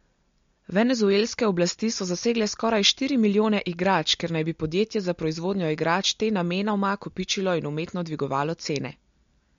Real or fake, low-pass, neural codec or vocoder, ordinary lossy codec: real; 7.2 kHz; none; MP3, 48 kbps